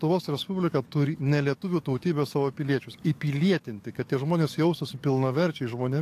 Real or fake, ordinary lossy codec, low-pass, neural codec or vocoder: fake; AAC, 64 kbps; 14.4 kHz; codec, 44.1 kHz, 7.8 kbps, DAC